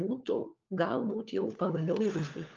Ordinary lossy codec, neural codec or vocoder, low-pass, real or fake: Opus, 24 kbps; codec, 16 kHz, 4 kbps, FunCodec, trained on LibriTTS, 50 frames a second; 7.2 kHz; fake